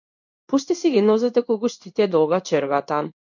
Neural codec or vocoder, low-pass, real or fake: codec, 16 kHz in and 24 kHz out, 1 kbps, XY-Tokenizer; 7.2 kHz; fake